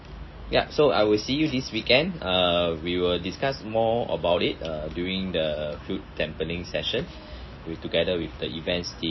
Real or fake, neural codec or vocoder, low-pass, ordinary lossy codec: real; none; 7.2 kHz; MP3, 24 kbps